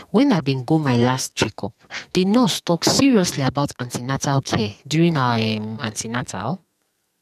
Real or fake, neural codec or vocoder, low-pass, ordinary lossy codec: fake; codec, 44.1 kHz, 2.6 kbps, SNAC; 14.4 kHz; none